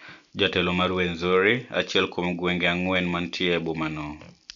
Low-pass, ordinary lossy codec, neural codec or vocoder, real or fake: 7.2 kHz; none; none; real